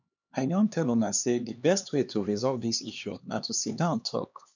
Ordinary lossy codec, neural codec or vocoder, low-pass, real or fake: none; codec, 16 kHz, 2 kbps, X-Codec, HuBERT features, trained on LibriSpeech; 7.2 kHz; fake